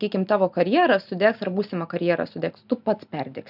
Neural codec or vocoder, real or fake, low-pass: none; real; 5.4 kHz